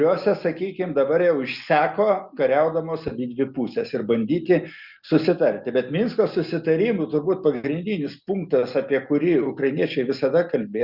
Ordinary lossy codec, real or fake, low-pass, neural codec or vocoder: Opus, 64 kbps; real; 5.4 kHz; none